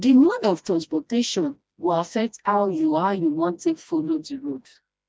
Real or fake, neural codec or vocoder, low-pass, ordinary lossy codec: fake; codec, 16 kHz, 1 kbps, FreqCodec, smaller model; none; none